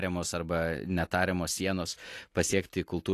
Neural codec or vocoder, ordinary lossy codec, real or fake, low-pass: none; AAC, 48 kbps; real; 14.4 kHz